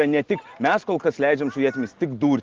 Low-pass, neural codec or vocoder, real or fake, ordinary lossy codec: 7.2 kHz; none; real; Opus, 16 kbps